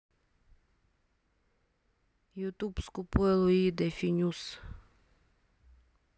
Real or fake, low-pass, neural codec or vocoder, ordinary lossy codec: real; none; none; none